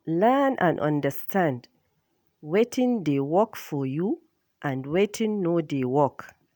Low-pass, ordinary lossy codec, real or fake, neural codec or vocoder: none; none; real; none